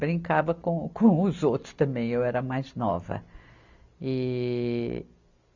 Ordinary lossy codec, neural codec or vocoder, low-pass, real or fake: none; none; 7.2 kHz; real